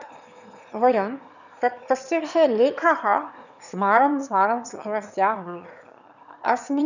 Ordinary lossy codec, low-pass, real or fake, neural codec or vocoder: none; 7.2 kHz; fake; autoencoder, 22.05 kHz, a latent of 192 numbers a frame, VITS, trained on one speaker